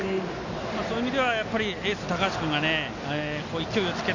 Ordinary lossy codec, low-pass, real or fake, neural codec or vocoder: none; 7.2 kHz; real; none